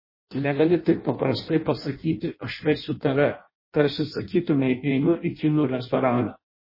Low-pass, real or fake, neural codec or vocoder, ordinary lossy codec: 5.4 kHz; fake; codec, 16 kHz in and 24 kHz out, 0.6 kbps, FireRedTTS-2 codec; MP3, 24 kbps